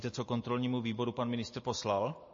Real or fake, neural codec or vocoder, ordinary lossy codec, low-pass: real; none; MP3, 32 kbps; 7.2 kHz